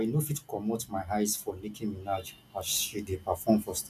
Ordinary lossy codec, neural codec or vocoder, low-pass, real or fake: none; none; 14.4 kHz; real